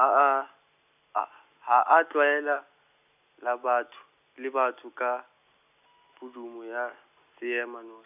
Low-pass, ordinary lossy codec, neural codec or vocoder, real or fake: 3.6 kHz; none; none; real